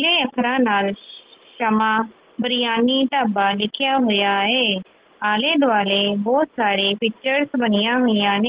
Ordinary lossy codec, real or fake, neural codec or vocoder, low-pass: Opus, 16 kbps; real; none; 3.6 kHz